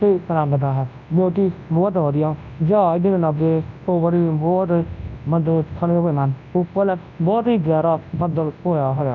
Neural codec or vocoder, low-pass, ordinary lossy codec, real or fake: codec, 24 kHz, 0.9 kbps, WavTokenizer, large speech release; 7.2 kHz; none; fake